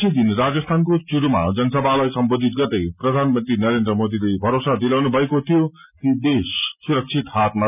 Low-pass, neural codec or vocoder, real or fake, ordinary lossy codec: 3.6 kHz; none; real; none